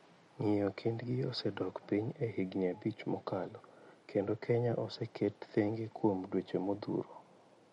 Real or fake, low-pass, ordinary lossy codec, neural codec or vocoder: real; 19.8 kHz; MP3, 48 kbps; none